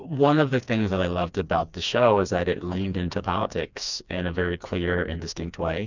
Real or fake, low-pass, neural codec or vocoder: fake; 7.2 kHz; codec, 16 kHz, 2 kbps, FreqCodec, smaller model